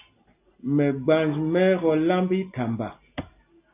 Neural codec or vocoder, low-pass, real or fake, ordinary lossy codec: none; 3.6 kHz; real; AAC, 24 kbps